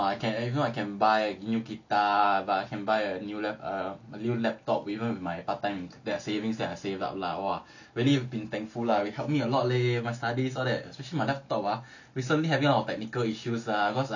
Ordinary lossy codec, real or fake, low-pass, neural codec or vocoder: none; real; 7.2 kHz; none